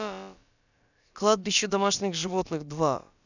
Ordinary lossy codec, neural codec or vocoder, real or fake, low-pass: none; codec, 16 kHz, about 1 kbps, DyCAST, with the encoder's durations; fake; 7.2 kHz